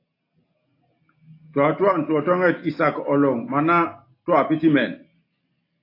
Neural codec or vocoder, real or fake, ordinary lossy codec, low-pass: none; real; AAC, 32 kbps; 5.4 kHz